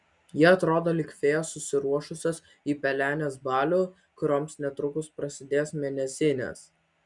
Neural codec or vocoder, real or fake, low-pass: none; real; 10.8 kHz